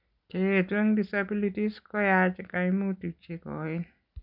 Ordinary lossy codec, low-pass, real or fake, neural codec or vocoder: none; 5.4 kHz; real; none